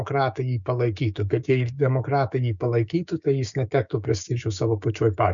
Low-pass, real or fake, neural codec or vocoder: 7.2 kHz; fake; codec, 16 kHz, 6 kbps, DAC